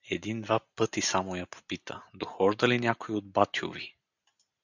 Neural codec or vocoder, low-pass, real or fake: none; 7.2 kHz; real